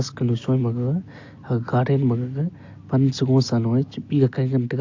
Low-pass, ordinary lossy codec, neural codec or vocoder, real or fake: 7.2 kHz; AAC, 48 kbps; none; real